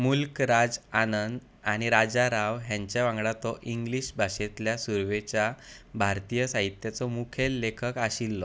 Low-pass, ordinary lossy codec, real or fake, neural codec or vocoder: none; none; real; none